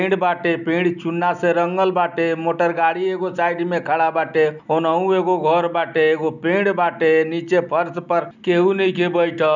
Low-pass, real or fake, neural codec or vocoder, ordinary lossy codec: 7.2 kHz; real; none; none